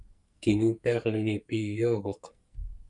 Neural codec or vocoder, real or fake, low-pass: codec, 44.1 kHz, 2.6 kbps, SNAC; fake; 10.8 kHz